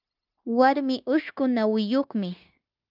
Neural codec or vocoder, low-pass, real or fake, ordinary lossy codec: codec, 16 kHz, 0.9 kbps, LongCat-Audio-Codec; 5.4 kHz; fake; Opus, 24 kbps